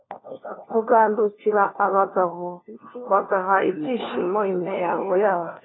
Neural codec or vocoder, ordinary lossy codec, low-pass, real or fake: codec, 16 kHz, 1 kbps, FunCodec, trained on LibriTTS, 50 frames a second; AAC, 16 kbps; 7.2 kHz; fake